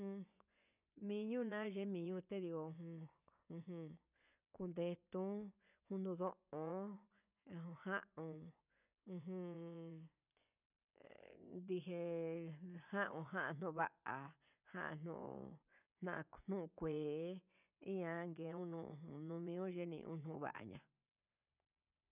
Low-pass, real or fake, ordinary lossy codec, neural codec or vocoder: 3.6 kHz; fake; none; vocoder, 24 kHz, 100 mel bands, Vocos